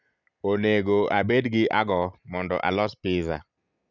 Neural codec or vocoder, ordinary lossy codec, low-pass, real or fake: none; none; 7.2 kHz; real